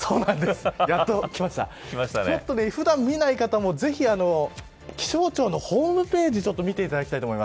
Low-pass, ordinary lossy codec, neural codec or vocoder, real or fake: none; none; none; real